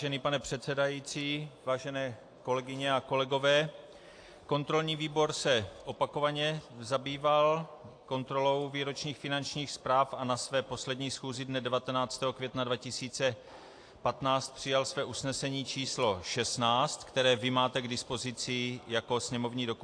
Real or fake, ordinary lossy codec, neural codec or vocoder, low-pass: real; AAC, 48 kbps; none; 9.9 kHz